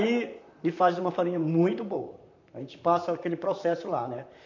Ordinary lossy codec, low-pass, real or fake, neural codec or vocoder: none; 7.2 kHz; fake; vocoder, 44.1 kHz, 128 mel bands, Pupu-Vocoder